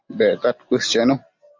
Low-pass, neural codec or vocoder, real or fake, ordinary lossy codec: 7.2 kHz; none; real; MP3, 64 kbps